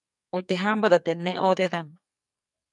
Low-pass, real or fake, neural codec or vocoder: 10.8 kHz; fake; codec, 44.1 kHz, 2.6 kbps, SNAC